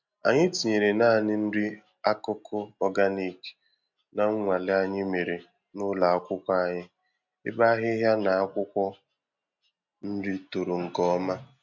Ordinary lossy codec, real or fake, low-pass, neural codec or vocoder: MP3, 64 kbps; real; 7.2 kHz; none